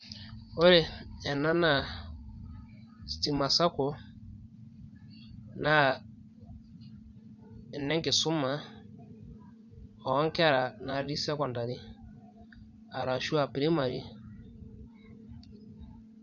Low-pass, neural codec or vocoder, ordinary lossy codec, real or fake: 7.2 kHz; vocoder, 44.1 kHz, 80 mel bands, Vocos; none; fake